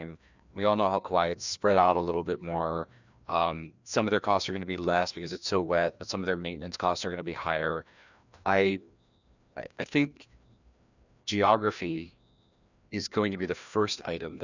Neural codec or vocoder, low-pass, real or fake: codec, 16 kHz, 1 kbps, FreqCodec, larger model; 7.2 kHz; fake